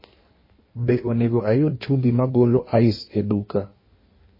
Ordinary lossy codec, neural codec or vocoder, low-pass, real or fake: MP3, 24 kbps; codec, 16 kHz in and 24 kHz out, 1.1 kbps, FireRedTTS-2 codec; 5.4 kHz; fake